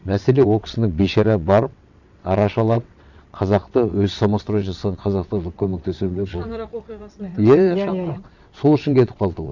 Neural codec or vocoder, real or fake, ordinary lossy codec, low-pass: vocoder, 22.05 kHz, 80 mel bands, WaveNeXt; fake; none; 7.2 kHz